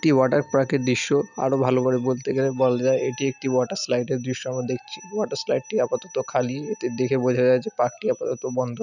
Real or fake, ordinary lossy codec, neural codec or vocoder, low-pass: real; none; none; 7.2 kHz